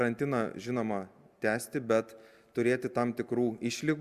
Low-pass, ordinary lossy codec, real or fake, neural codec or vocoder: 14.4 kHz; Opus, 64 kbps; real; none